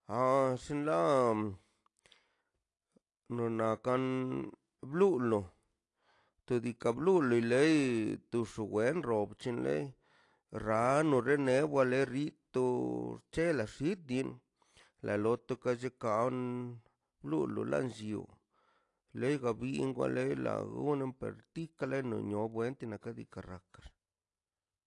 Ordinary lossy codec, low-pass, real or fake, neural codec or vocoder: AAC, 48 kbps; 10.8 kHz; real; none